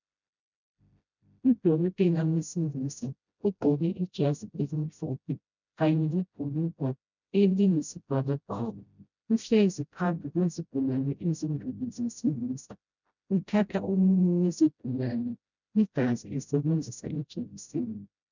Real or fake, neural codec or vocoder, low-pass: fake; codec, 16 kHz, 0.5 kbps, FreqCodec, smaller model; 7.2 kHz